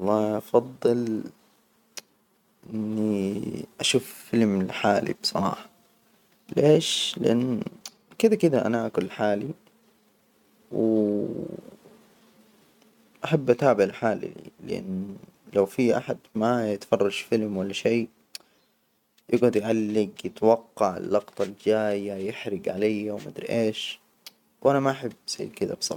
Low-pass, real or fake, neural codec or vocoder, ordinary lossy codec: 19.8 kHz; real; none; none